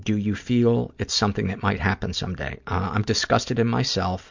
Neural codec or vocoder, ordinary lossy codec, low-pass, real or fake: none; MP3, 64 kbps; 7.2 kHz; real